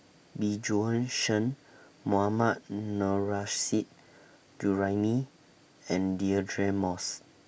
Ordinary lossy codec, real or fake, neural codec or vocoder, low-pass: none; real; none; none